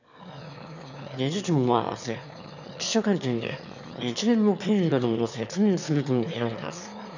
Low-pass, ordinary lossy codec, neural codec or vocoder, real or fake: 7.2 kHz; none; autoencoder, 22.05 kHz, a latent of 192 numbers a frame, VITS, trained on one speaker; fake